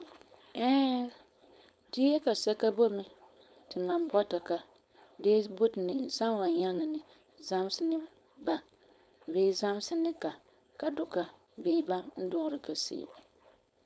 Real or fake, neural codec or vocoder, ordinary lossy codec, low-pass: fake; codec, 16 kHz, 4.8 kbps, FACodec; none; none